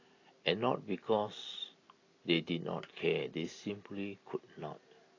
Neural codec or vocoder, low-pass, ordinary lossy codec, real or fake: none; 7.2 kHz; AAC, 32 kbps; real